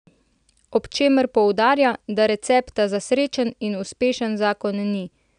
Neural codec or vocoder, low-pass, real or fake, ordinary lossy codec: none; 9.9 kHz; real; none